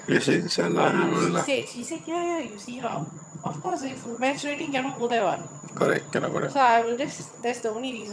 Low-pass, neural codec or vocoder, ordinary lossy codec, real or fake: none; vocoder, 22.05 kHz, 80 mel bands, HiFi-GAN; none; fake